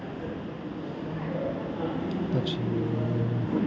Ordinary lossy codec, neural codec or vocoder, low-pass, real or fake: none; none; none; real